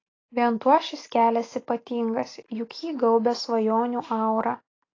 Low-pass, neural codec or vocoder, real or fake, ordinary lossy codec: 7.2 kHz; none; real; AAC, 32 kbps